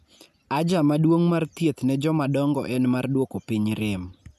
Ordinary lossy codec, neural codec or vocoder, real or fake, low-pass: none; none; real; 14.4 kHz